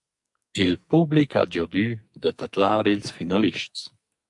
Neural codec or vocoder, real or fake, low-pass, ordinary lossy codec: codec, 44.1 kHz, 2.6 kbps, SNAC; fake; 10.8 kHz; MP3, 64 kbps